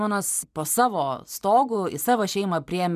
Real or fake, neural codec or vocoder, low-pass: fake; vocoder, 44.1 kHz, 128 mel bands every 512 samples, BigVGAN v2; 14.4 kHz